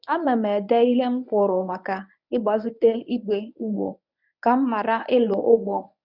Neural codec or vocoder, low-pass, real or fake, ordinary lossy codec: codec, 24 kHz, 0.9 kbps, WavTokenizer, medium speech release version 1; 5.4 kHz; fake; none